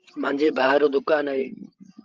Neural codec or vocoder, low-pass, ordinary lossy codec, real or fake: codec, 16 kHz, 16 kbps, FreqCodec, larger model; 7.2 kHz; Opus, 24 kbps; fake